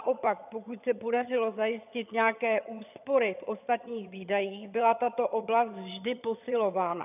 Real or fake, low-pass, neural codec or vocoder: fake; 3.6 kHz; vocoder, 22.05 kHz, 80 mel bands, HiFi-GAN